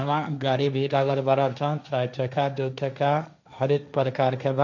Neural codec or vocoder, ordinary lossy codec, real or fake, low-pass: codec, 16 kHz, 1.1 kbps, Voila-Tokenizer; none; fake; 7.2 kHz